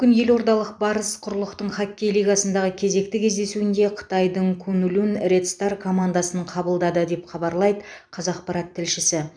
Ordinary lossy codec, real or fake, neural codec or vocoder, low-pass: none; fake; vocoder, 44.1 kHz, 128 mel bands every 256 samples, BigVGAN v2; 9.9 kHz